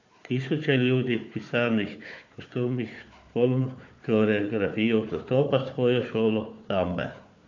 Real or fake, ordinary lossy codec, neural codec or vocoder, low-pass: fake; MP3, 48 kbps; codec, 16 kHz, 4 kbps, FunCodec, trained on Chinese and English, 50 frames a second; 7.2 kHz